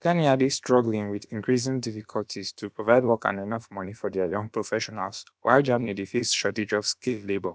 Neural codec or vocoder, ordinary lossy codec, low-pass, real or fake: codec, 16 kHz, about 1 kbps, DyCAST, with the encoder's durations; none; none; fake